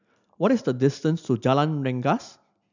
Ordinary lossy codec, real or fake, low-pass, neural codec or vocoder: none; real; 7.2 kHz; none